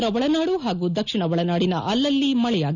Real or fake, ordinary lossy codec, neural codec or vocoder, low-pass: real; none; none; 7.2 kHz